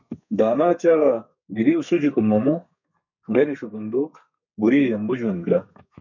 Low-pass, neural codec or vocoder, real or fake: 7.2 kHz; codec, 32 kHz, 1.9 kbps, SNAC; fake